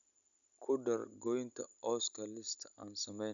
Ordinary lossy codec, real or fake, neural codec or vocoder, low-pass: none; real; none; 7.2 kHz